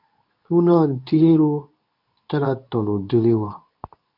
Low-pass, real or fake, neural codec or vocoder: 5.4 kHz; fake; codec, 24 kHz, 0.9 kbps, WavTokenizer, medium speech release version 2